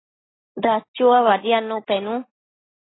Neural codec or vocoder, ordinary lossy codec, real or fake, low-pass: none; AAC, 16 kbps; real; 7.2 kHz